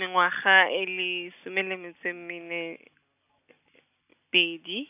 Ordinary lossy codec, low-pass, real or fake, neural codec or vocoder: none; 3.6 kHz; real; none